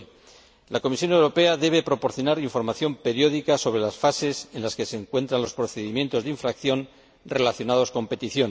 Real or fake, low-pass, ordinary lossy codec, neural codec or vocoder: real; none; none; none